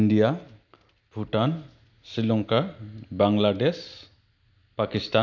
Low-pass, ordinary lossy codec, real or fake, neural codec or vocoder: 7.2 kHz; none; real; none